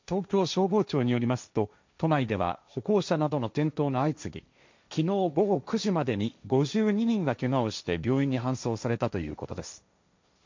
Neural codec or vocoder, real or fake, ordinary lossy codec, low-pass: codec, 16 kHz, 1.1 kbps, Voila-Tokenizer; fake; MP3, 64 kbps; 7.2 kHz